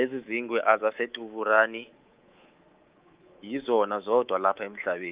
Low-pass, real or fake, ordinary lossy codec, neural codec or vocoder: 3.6 kHz; fake; Opus, 32 kbps; codec, 24 kHz, 3.1 kbps, DualCodec